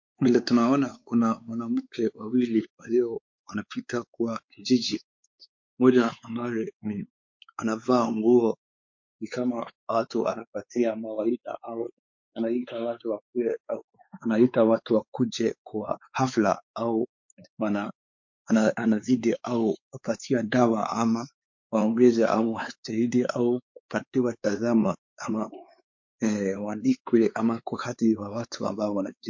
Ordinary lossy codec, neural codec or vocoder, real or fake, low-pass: MP3, 48 kbps; codec, 16 kHz, 4 kbps, X-Codec, WavLM features, trained on Multilingual LibriSpeech; fake; 7.2 kHz